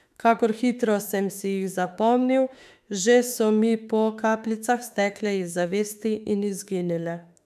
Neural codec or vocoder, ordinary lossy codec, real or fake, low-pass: autoencoder, 48 kHz, 32 numbers a frame, DAC-VAE, trained on Japanese speech; none; fake; 14.4 kHz